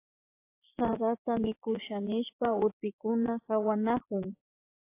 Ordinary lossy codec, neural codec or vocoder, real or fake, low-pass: AAC, 24 kbps; vocoder, 24 kHz, 100 mel bands, Vocos; fake; 3.6 kHz